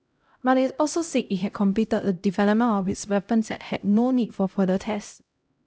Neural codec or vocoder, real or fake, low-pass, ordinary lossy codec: codec, 16 kHz, 0.5 kbps, X-Codec, HuBERT features, trained on LibriSpeech; fake; none; none